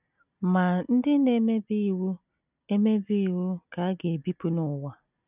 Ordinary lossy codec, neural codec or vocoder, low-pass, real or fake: none; none; 3.6 kHz; real